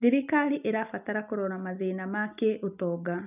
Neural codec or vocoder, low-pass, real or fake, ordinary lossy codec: none; 3.6 kHz; real; none